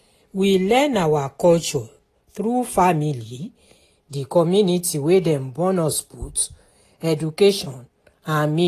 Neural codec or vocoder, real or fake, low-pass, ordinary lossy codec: none; real; 14.4 kHz; AAC, 48 kbps